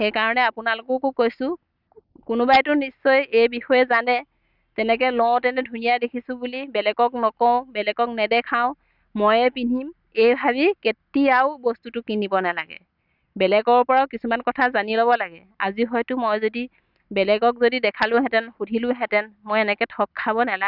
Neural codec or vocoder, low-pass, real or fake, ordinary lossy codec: none; 5.4 kHz; real; none